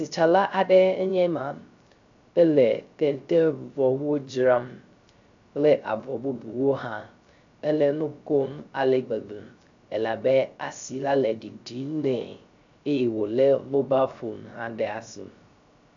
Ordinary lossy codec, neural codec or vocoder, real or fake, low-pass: AAC, 64 kbps; codec, 16 kHz, 0.3 kbps, FocalCodec; fake; 7.2 kHz